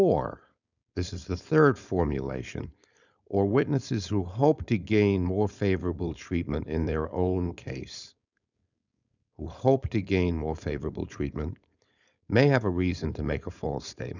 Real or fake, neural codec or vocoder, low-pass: fake; codec, 16 kHz, 4.8 kbps, FACodec; 7.2 kHz